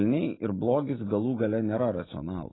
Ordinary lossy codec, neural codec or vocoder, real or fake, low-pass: AAC, 16 kbps; none; real; 7.2 kHz